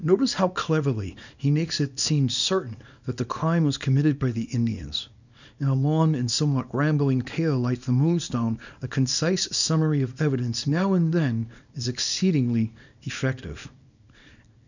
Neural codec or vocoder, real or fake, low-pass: codec, 24 kHz, 0.9 kbps, WavTokenizer, small release; fake; 7.2 kHz